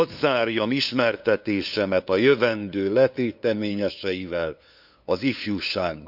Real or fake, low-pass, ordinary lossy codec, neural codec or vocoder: fake; 5.4 kHz; none; codec, 16 kHz, 2 kbps, FunCodec, trained on LibriTTS, 25 frames a second